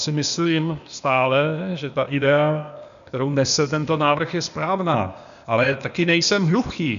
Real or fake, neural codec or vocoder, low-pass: fake; codec, 16 kHz, 0.8 kbps, ZipCodec; 7.2 kHz